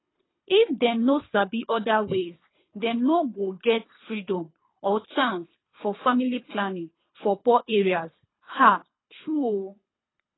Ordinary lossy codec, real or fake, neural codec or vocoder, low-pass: AAC, 16 kbps; fake; codec, 24 kHz, 3 kbps, HILCodec; 7.2 kHz